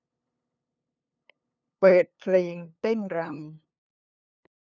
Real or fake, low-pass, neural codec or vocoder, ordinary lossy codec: fake; 7.2 kHz; codec, 16 kHz, 2 kbps, FunCodec, trained on LibriTTS, 25 frames a second; none